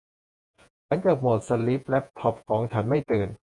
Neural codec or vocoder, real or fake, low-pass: vocoder, 48 kHz, 128 mel bands, Vocos; fake; 10.8 kHz